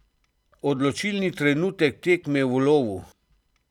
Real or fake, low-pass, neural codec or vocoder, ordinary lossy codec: fake; 19.8 kHz; vocoder, 44.1 kHz, 128 mel bands every 256 samples, BigVGAN v2; none